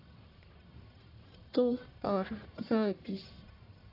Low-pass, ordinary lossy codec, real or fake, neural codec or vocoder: 5.4 kHz; AAC, 32 kbps; fake; codec, 44.1 kHz, 1.7 kbps, Pupu-Codec